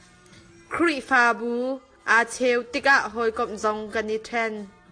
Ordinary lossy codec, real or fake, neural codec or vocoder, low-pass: AAC, 48 kbps; real; none; 9.9 kHz